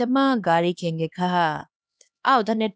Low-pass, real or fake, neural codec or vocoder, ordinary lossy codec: none; fake; codec, 16 kHz, 2 kbps, X-Codec, HuBERT features, trained on LibriSpeech; none